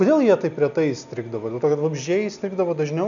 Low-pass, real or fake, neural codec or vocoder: 7.2 kHz; real; none